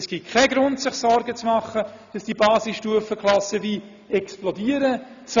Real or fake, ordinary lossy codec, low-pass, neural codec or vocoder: real; none; 7.2 kHz; none